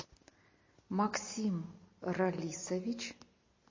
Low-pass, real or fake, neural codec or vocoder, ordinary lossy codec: 7.2 kHz; real; none; MP3, 32 kbps